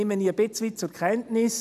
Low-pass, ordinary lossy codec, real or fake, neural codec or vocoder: 14.4 kHz; none; real; none